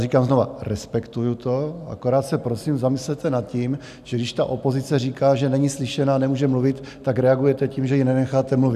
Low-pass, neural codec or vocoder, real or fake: 14.4 kHz; none; real